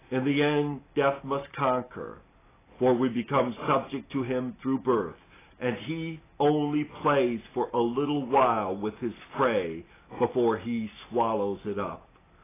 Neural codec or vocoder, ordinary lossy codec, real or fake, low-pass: none; AAC, 16 kbps; real; 3.6 kHz